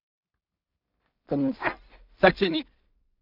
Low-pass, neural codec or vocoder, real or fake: 5.4 kHz; codec, 16 kHz in and 24 kHz out, 0.4 kbps, LongCat-Audio-Codec, two codebook decoder; fake